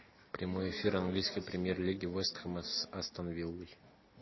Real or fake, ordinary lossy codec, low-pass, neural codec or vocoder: real; MP3, 24 kbps; 7.2 kHz; none